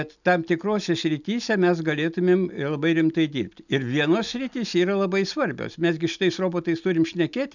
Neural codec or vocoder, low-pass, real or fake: none; 7.2 kHz; real